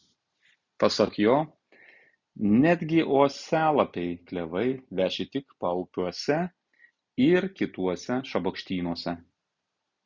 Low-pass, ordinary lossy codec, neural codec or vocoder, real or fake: 7.2 kHz; Opus, 64 kbps; none; real